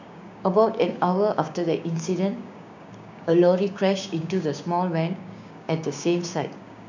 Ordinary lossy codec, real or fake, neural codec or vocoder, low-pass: none; fake; codec, 16 kHz, 6 kbps, DAC; 7.2 kHz